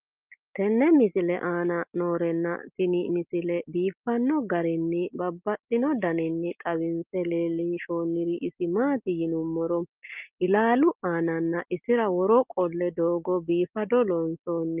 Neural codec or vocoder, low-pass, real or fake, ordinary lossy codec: none; 3.6 kHz; real; Opus, 32 kbps